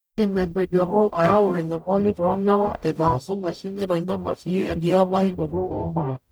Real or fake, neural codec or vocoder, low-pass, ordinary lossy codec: fake; codec, 44.1 kHz, 0.9 kbps, DAC; none; none